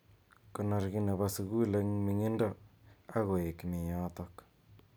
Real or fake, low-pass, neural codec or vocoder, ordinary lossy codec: real; none; none; none